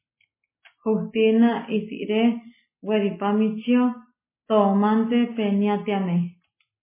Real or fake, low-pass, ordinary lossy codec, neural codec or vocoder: real; 3.6 kHz; MP3, 16 kbps; none